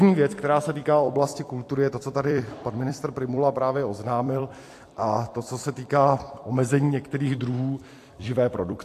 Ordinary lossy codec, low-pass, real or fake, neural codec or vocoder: AAC, 64 kbps; 14.4 kHz; fake; vocoder, 44.1 kHz, 128 mel bands every 256 samples, BigVGAN v2